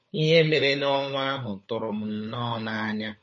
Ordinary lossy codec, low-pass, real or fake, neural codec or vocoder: MP3, 32 kbps; 7.2 kHz; fake; codec, 16 kHz, 4 kbps, FunCodec, trained on LibriTTS, 50 frames a second